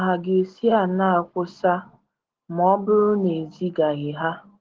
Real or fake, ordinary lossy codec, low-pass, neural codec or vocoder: real; Opus, 16 kbps; 7.2 kHz; none